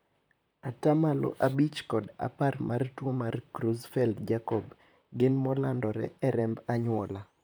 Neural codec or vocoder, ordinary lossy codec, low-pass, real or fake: vocoder, 44.1 kHz, 128 mel bands, Pupu-Vocoder; none; none; fake